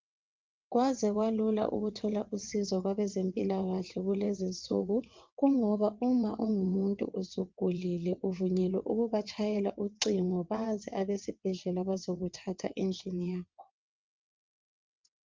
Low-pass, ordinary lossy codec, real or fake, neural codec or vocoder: 7.2 kHz; Opus, 32 kbps; fake; vocoder, 22.05 kHz, 80 mel bands, WaveNeXt